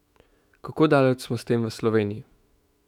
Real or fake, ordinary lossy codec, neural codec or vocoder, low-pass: fake; none; autoencoder, 48 kHz, 128 numbers a frame, DAC-VAE, trained on Japanese speech; 19.8 kHz